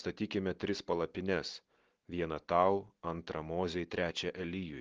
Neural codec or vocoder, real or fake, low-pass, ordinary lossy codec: none; real; 7.2 kHz; Opus, 16 kbps